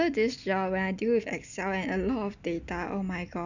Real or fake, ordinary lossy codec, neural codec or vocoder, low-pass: real; none; none; 7.2 kHz